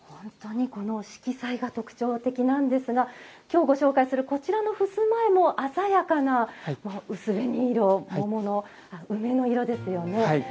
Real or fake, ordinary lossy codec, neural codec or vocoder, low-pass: real; none; none; none